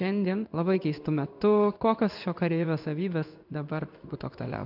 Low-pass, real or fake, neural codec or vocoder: 5.4 kHz; fake; codec, 16 kHz in and 24 kHz out, 1 kbps, XY-Tokenizer